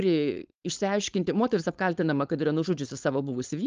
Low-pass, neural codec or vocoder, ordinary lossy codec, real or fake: 7.2 kHz; codec, 16 kHz, 4.8 kbps, FACodec; Opus, 24 kbps; fake